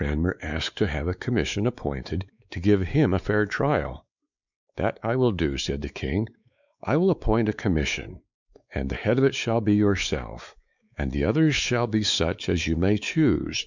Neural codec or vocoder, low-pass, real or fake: codec, 16 kHz, 4 kbps, X-Codec, WavLM features, trained on Multilingual LibriSpeech; 7.2 kHz; fake